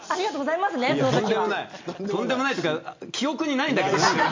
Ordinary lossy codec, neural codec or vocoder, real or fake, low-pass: MP3, 64 kbps; none; real; 7.2 kHz